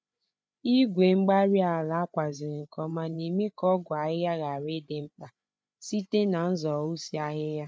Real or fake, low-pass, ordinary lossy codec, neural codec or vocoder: fake; none; none; codec, 16 kHz, 8 kbps, FreqCodec, larger model